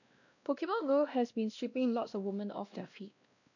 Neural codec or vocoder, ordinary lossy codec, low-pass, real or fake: codec, 16 kHz, 1 kbps, X-Codec, WavLM features, trained on Multilingual LibriSpeech; none; 7.2 kHz; fake